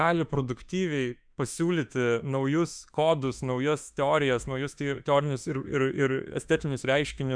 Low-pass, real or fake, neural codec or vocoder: 9.9 kHz; fake; autoencoder, 48 kHz, 32 numbers a frame, DAC-VAE, trained on Japanese speech